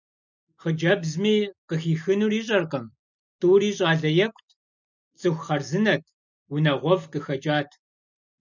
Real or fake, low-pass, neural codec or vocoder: real; 7.2 kHz; none